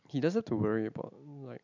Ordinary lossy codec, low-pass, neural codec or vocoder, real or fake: none; 7.2 kHz; none; real